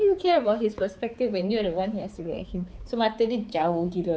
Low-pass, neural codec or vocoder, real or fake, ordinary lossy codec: none; codec, 16 kHz, 4 kbps, X-Codec, HuBERT features, trained on balanced general audio; fake; none